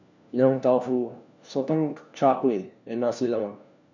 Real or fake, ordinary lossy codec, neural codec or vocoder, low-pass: fake; none; codec, 16 kHz, 1 kbps, FunCodec, trained on LibriTTS, 50 frames a second; 7.2 kHz